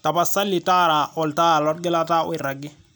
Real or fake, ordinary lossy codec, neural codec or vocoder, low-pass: real; none; none; none